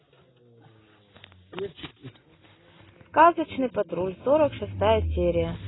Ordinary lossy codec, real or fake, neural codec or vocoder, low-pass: AAC, 16 kbps; real; none; 7.2 kHz